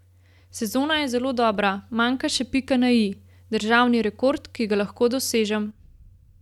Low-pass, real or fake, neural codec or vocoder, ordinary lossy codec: 19.8 kHz; real; none; none